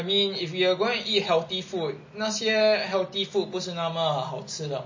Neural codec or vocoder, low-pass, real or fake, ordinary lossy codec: none; 7.2 kHz; real; MP3, 32 kbps